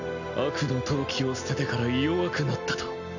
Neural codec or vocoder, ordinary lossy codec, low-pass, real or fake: none; none; 7.2 kHz; real